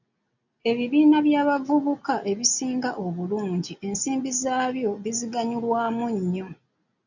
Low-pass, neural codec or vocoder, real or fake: 7.2 kHz; none; real